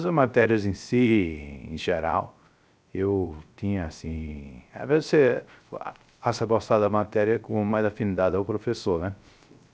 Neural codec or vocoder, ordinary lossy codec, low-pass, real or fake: codec, 16 kHz, 0.3 kbps, FocalCodec; none; none; fake